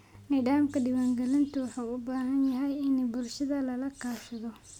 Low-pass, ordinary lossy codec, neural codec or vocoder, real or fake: 19.8 kHz; none; none; real